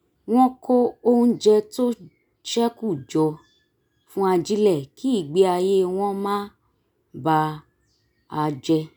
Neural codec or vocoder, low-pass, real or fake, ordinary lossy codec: none; none; real; none